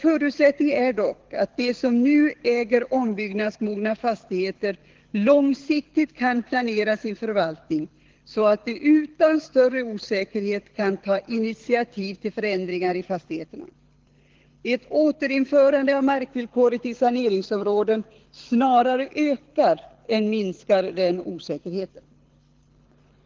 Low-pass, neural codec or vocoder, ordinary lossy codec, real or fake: 7.2 kHz; codec, 24 kHz, 6 kbps, HILCodec; Opus, 16 kbps; fake